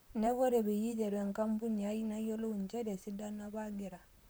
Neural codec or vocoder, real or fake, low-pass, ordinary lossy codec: vocoder, 44.1 kHz, 128 mel bands, Pupu-Vocoder; fake; none; none